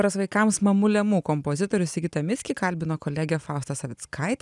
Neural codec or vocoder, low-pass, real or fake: none; 10.8 kHz; real